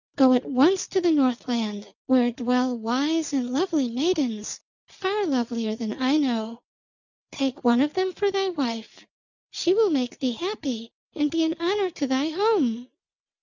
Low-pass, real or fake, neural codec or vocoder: 7.2 kHz; real; none